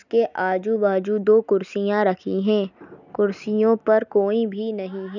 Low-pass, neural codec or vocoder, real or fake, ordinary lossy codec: 7.2 kHz; none; real; none